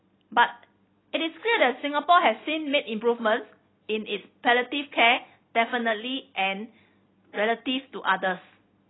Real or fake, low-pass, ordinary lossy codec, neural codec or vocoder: real; 7.2 kHz; AAC, 16 kbps; none